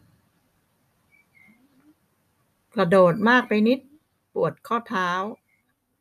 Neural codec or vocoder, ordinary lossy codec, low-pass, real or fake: none; none; 14.4 kHz; real